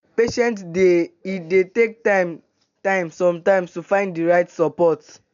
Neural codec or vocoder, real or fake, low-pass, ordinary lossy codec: none; real; 7.2 kHz; none